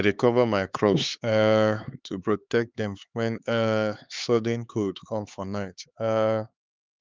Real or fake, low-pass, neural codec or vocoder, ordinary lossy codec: fake; 7.2 kHz; codec, 16 kHz, 4 kbps, X-Codec, HuBERT features, trained on LibriSpeech; Opus, 32 kbps